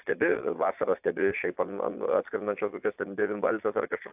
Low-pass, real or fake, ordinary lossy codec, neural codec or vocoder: 3.6 kHz; fake; AAC, 32 kbps; vocoder, 22.05 kHz, 80 mel bands, Vocos